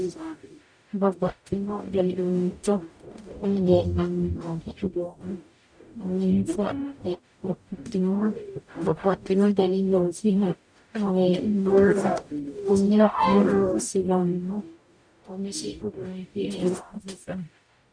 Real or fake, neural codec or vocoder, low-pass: fake; codec, 44.1 kHz, 0.9 kbps, DAC; 9.9 kHz